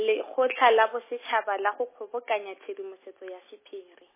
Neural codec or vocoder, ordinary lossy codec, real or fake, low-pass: none; MP3, 16 kbps; real; 3.6 kHz